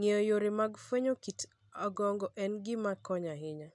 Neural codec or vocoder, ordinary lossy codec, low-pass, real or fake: none; none; 10.8 kHz; real